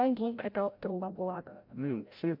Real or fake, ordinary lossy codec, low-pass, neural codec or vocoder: fake; MP3, 48 kbps; 5.4 kHz; codec, 16 kHz, 0.5 kbps, FreqCodec, larger model